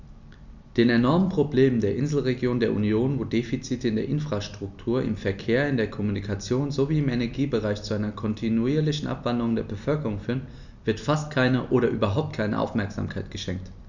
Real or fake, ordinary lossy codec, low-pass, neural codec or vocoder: real; none; 7.2 kHz; none